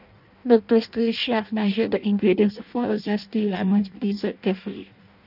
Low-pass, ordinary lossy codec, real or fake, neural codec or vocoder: 5.4 kHz; none; fake; codec, 16 kHz in and 24 kHz out, 0.6 kbps, FireRedTTS-2 codec